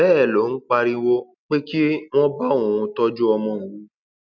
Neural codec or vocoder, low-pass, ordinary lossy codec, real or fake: none; 7.2 kHz; none; real